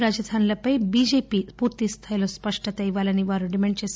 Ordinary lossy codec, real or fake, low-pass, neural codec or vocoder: none; real; none; none